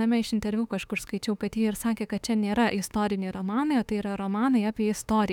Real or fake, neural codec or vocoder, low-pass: fake; autoencoder, 48 kHz, 32 numbers a frame, DAC-VAE, trained on Japanese speech; 19.8 kHz